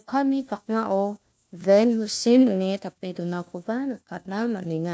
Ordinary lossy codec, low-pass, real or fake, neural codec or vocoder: none; none; fake; codec, 16 kHz, 1 kbps, FunCodec, trained on LibriTTS, 50 frames a second